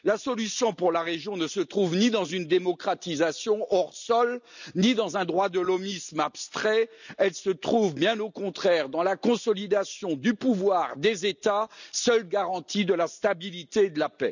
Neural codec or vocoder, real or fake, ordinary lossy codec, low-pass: none; real; none; 7.2 kHz